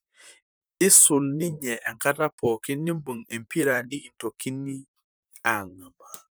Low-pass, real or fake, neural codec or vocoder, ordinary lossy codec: none; fake; vocoder, 44.1 kHz, 128 mel bands, Pupu-Vocoder; none